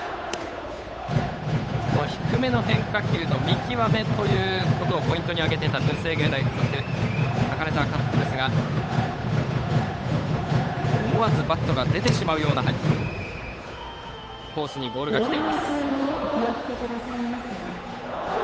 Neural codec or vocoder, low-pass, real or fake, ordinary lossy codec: codec, 16 kHz, 8 kbps, FunCodec, trained on Chinese and English, 25 frames a second; none; fake; none